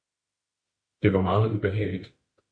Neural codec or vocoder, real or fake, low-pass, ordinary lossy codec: autoencoder, 48 kHz, 32 numbers a frame, DAC-VAE, trained on Japanese speech; fake; 9.9 kHz; MP3, 48 kbps